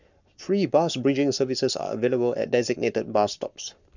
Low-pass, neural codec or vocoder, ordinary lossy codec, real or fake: 7.2 kHz; codec, 44.1 kHz, 7.8 kbps, Pupu-Codec; none; fake